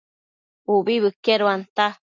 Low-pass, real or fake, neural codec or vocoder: 7.2 kHz; real; none